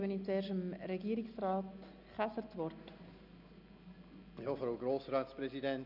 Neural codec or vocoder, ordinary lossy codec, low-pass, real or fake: none; none; 5.4 kHz; real